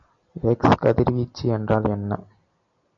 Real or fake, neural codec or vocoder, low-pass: real; none; 7.2 kHz